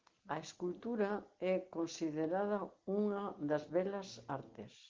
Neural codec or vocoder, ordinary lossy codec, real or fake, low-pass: none; Opus, 16 kbps; real; 7.2 kHz